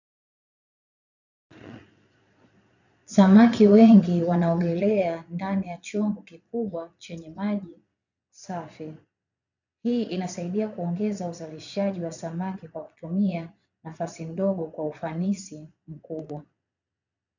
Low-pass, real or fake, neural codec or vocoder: 7.2 kHz; fake; vocoder, 22.05 kHz, 80 mel bands, Vocos